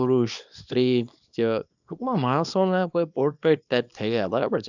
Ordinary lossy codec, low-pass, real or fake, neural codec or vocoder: none; 7.2 kHz; fake; codec, 24 kHz, 0.9 kbps, WavTokenizer, small release